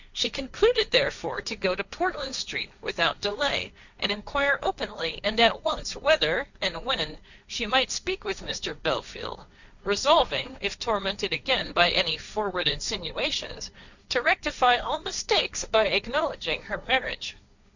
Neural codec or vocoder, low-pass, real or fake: codec, 16 kHz, 1.1 kbps, Voila-Tokenizer; 7.2 kHz; fake